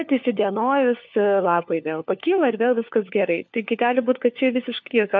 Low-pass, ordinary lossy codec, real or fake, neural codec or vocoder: 7.2 kHz; MP3, 48 kbps; fake; codec, 16 kHz, 4 kbps, FunCodec, trained on LibriTTS, 50 frames a second